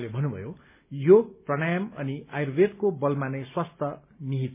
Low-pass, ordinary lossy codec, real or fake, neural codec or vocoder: 3.6 kHz; MP3, 24 kbps; real; none